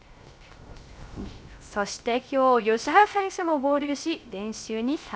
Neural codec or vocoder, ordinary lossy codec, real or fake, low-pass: codec, 16 kHz, 0.3 kbps, FocalCodec; none; fake; none